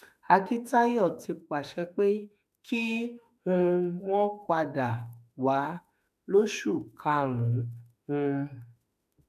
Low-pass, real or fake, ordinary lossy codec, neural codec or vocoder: 14.4 kHz; fake; none; autoencoder, 48 kHz, 32 numbers a frame, DAC-VAE, trained on Japanese speech